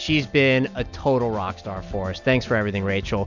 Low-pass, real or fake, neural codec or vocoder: 7.2 kHz; real; none